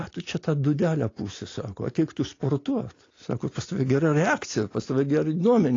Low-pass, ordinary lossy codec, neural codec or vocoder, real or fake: 7.2 kHz; AAC, 32 kbps; none; real